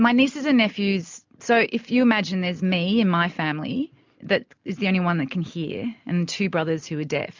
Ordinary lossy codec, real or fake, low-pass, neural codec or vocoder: MP3, 64 kbps; real; 7.2 kHz; none